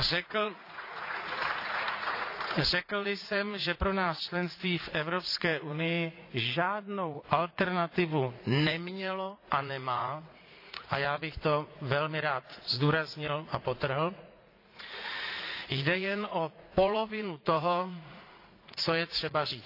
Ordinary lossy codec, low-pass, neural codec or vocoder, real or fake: AAC, 32 kbps; 5.4 kHz; vocoder, 44.1 kHz, 80 mel bands, Vocos; fake